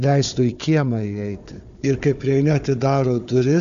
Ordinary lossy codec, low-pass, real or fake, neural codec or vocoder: MP3, 96 kbps; 7.2 kHz; fake; codec, 16 kHz, 4 kbps, FunCodec, trained on Chinese and English, 50 frames a second